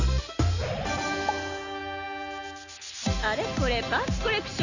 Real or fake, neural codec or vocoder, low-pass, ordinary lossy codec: real; none; 7.2 kHz; none